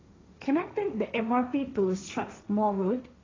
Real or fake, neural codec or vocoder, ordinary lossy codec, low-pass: fake; codec, 16 kHz, 1.1 kbps, Voila-Tokenizer; AAC, 32 kbps; 7.2 kHz